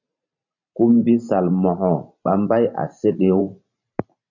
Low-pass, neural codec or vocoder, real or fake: 7.2 kHz; vocoder, 44.1 kHz, 128 mel bands every 512 samples, BigVGAN v2; fake